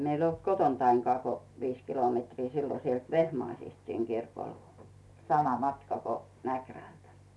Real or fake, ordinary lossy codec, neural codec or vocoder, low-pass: real; none; none; none